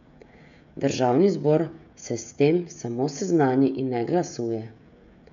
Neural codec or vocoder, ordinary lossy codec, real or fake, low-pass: codec, 16 kHz, 16 kbps, FreqCodec, smaller model; none; fake; 7.2 kHz